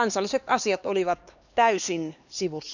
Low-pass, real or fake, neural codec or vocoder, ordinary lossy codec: 7.2 kHz; fake; codec, 16 kHz, 2 kbps, X-Codec, HuBERT features, trained on LibriSpeech; none